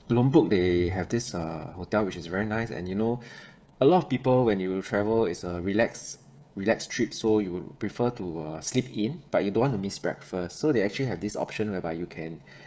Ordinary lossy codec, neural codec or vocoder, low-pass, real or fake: none; codec, 16 kHz, 16 kbps, FreqCodec, smaller model; none; fake